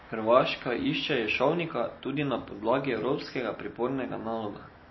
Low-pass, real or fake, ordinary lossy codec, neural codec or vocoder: 7.2 kHz; real; MP3, 24 kbps; none